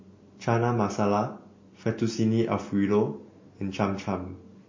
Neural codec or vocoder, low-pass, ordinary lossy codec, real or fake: none; 7.2 kHz; MP3, 32 kbps; real